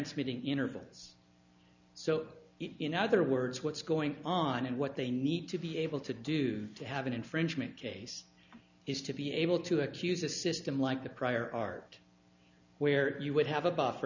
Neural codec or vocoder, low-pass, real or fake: none; 7.2 kHz; real